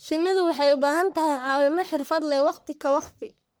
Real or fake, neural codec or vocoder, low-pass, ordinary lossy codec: fake; codec, 44.1 kHz, 1.7 kbps, Pupu-Codec; none; none